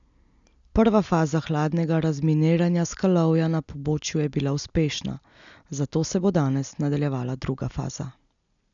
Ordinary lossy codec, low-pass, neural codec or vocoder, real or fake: none; 7.2 kHz; none; real